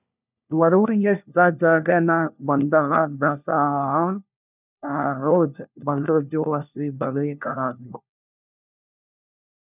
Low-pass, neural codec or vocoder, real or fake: 3.6 kHz; codec, 16 kHz, 1 kbps, FunCodec, trained on LibriTTS, 50 frames a second; fake